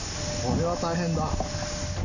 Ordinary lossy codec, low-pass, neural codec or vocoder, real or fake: none; 7.2 kHz; none; real